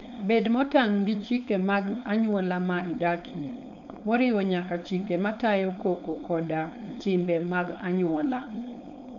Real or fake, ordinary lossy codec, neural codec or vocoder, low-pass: fake; none; codec, 16 kHz, 4.8 kbps, FACodec; 7.2 kHz